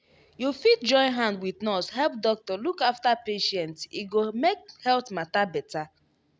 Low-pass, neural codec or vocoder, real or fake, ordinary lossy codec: none; none; real; none